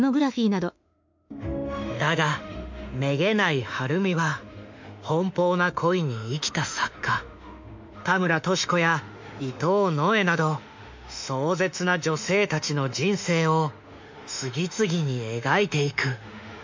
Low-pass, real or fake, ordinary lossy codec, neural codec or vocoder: 7.2 kHz; fake; MP3, 64 kbps; autoencoder, 48 kHz, 32 numbers a frame, DAC-VAE, trained on Japanese speech